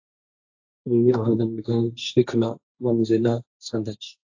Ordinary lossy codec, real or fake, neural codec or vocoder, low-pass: AAC, 48 kbps; fake; codec, 16 kHz, 1.1 kbps, Voila-Tokenizer; 7.2 kHz